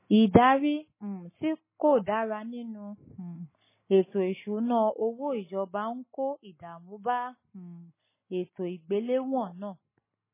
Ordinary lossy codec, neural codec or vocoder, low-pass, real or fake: MP3, 16 kbps; none; 3.6 kHz; real